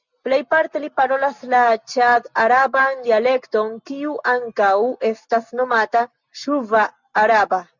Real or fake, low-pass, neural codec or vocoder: real; 7.2 kHz; none